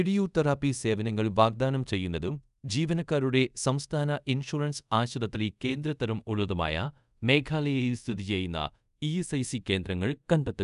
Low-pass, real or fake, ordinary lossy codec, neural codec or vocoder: 10.8 kHz; fake; none; codec, 24 kHz, 0.5 kbps, DualCodec